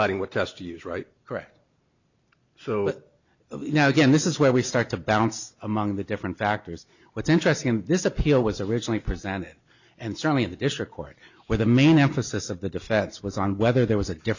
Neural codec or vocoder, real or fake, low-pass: none; real; 7.2 kHz